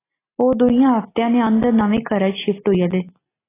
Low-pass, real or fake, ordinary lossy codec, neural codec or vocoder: 3.6 kHz; real; AAC, 16 kbps; none